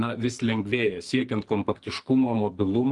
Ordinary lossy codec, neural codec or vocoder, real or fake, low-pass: Opus, 24 kbps; codec, 44.1 kHz, 2.6 kbps, SNAC; fake; 10.8 kHz